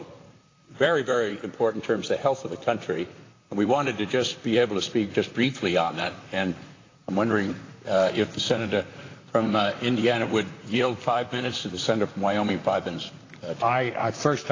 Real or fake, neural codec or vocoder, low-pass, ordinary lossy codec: fake; vocoder, 44.1 kHz, 128 mel bands, Pupu-Vocoder; 7.2 kHz; AAC, 32 kbps